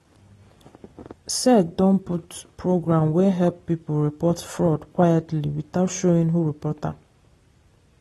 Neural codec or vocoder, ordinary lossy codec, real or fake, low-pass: none; AAC, 32 kbps; real; 19.8 kHz